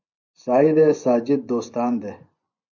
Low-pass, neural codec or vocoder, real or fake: 7.2 kHz; vocoder, 24 kHz, 100 mel bands, Vocos; fake